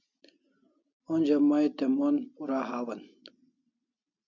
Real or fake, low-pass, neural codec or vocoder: real; 7.2 kHz; none